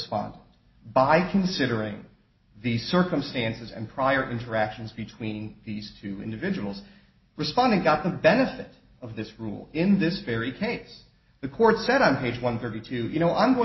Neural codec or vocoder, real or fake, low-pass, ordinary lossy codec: none; real; 7.2 kHz; MP3, 24 kbps